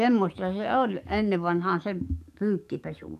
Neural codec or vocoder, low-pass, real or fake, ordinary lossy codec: codec, 44.1 kHz, 7.8 kbps, DAC; 14.4 kHz; fake; none